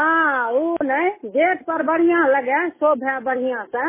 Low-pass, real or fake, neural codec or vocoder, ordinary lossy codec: 3.6 kHz; real; none; MP3, 16 kbps